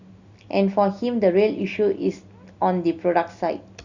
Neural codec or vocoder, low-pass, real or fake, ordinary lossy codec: none; 7.2 kHz; real; Opus, 64 kbps